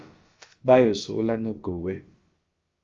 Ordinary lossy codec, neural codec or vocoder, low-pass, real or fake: Opus, 32 kbps; codec, 16 kHz, about 1 kbps, DyCAST, with the encoder's durations; 7.2 kHz; fake